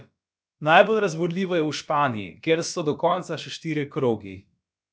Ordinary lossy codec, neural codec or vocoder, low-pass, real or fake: none; codec, 16 kHz, about 1 kbps, DyCAST, with the encoder's durations; none; fake